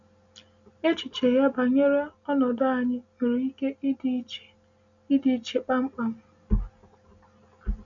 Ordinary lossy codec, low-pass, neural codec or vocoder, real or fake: none; 7.2 kHz; none; real